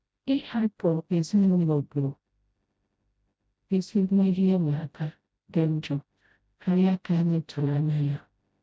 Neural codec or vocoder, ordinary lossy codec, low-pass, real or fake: codec, 16 kHz, 0.5 kbps, FreqCodec, smaller model; none; none; fake